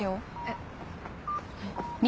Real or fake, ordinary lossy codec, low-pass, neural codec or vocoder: real; none; none; none